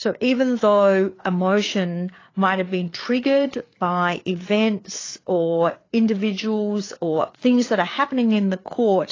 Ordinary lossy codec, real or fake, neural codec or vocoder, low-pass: AAC, 32 kbps; fake; codec, 16 kHz, 4 kbps, FreqCodec, larger model; 7.2 kHz